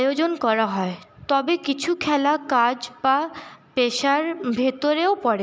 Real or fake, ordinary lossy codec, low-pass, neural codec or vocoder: real; none; none; none